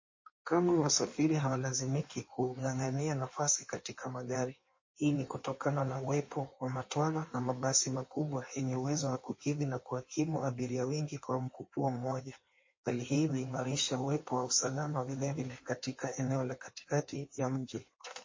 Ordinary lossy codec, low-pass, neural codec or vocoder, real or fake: MP3, 32 kbps; 7.2 kHz; codec, 16 kHz in and 24 kHz out, 1.1 kbps, FireRedTTS-2 codec; fake